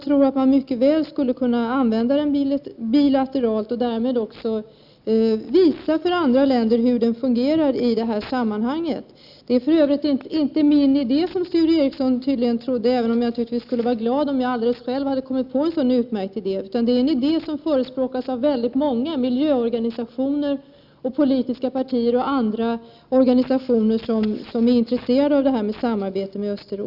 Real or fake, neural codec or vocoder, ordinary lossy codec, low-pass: real; none; none; 5.4 kHz